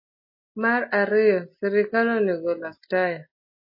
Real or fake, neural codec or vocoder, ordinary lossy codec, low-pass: real; none; MP3, 24 kbps; 5.4 kHz